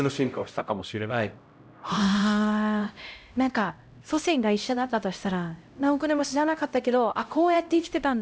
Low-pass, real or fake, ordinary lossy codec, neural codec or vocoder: none; fake; none; codec, 16 kHz, 0.5 kbps, X-Codec, HuBERT features, trained on LibriSpeech